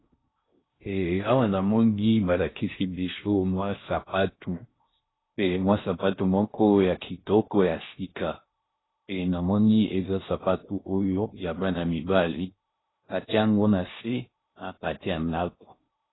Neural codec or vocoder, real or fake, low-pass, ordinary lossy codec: codec, 16 kHz in and 24 kHz out, 0.6 kbps, FocalCodec, streaming, 4096 codes; fake; 7.2 kHz; AAC, 16 kbps